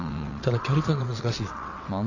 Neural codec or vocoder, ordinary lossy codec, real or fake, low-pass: vocoder, 22.05 kHz, 80 mel bands, WaveNeXt; AAC, 48 kbps; fake; 7.2 kHz